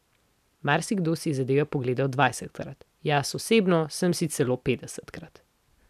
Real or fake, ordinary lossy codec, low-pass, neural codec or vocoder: real; none; 14.4 kHz; none